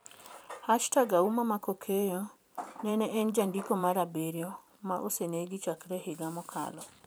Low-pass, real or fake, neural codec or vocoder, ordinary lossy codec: none; fake; vocoder, 44.1 kHz, 128 mel bands every 512 samples, BigVGAN v2; none